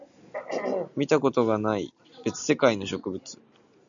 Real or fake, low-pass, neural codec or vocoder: real; 7.2 kHz; none